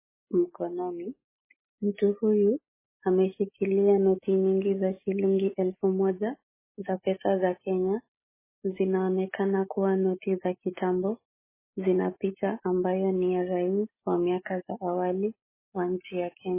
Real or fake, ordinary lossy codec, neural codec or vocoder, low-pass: real; MP3, 16 kbps; none; 3.6 kHz